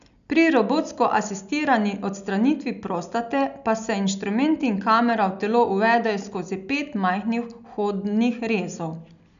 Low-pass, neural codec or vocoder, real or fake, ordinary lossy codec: 7.2 kHz; none; real; none